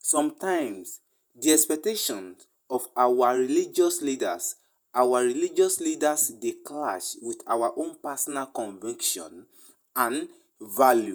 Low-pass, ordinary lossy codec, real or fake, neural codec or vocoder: none; none; fake; vocoder, 48 kHz, 128 mel bands, Vocos